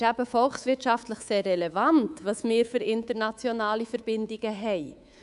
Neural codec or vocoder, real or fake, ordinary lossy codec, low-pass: codec, 24 kHz, 3.1 kbps, DualCodec; fake; none; 10.8 kHz